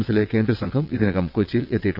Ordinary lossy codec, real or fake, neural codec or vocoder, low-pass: none; fake; vocoder, 22.05 kHz, 80 mel bands, WaveNeXt; 5.4 kHz